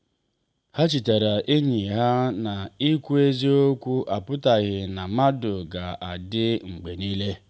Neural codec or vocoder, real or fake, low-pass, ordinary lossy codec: none; real; none; none